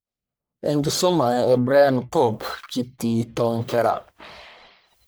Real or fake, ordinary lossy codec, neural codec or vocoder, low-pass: fake; none; codec, 44.1 kHz, 1.7 kbps, Pupu-Codec; none